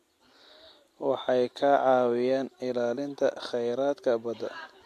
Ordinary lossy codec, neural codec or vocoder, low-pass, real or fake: AAC, 64 kbps; none; 14.4 kHz; real